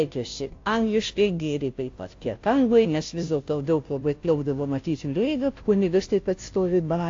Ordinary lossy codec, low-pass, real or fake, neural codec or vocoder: AAC, 48 kbps; 7.2 kHz; fake; codec, 16 kHz, 0.5 kbps, FunCodec, trained on Chinese and English, 25 frames a second